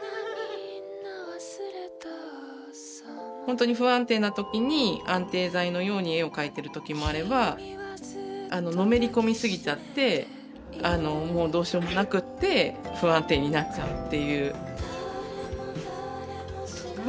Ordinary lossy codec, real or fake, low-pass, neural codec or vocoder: none; real; none; none